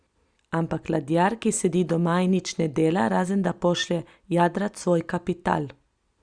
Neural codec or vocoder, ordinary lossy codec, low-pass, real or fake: none; Opus, 64 kbps; 9.9 kHz; real